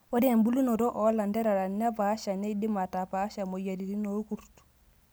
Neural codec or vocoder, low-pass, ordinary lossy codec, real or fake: none; none; none; real